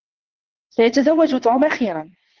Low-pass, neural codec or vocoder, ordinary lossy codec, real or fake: 7.2 kHz; vocoder, 22.05 kHz, 80 mel bands, WaveNeXt; Opus, 16 kbps; fake